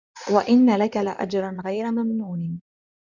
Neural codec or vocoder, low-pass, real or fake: codec, 16 kHz in and 24 kHz out, 2.2 kbps, FireRedTTS-2 codec; 7.2 kHz; fake